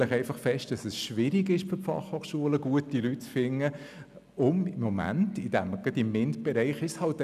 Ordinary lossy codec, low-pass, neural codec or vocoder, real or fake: none; 14.4 kHz; none; real